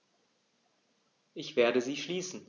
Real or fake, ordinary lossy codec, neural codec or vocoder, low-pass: real; none; none; 7.2 kHz